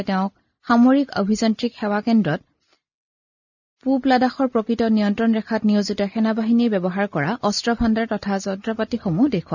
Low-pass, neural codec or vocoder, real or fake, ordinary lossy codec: 7.2 kHz; none; real; none